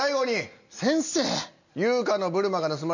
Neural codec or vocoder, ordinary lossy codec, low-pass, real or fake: none; none; 7.2 kHz; real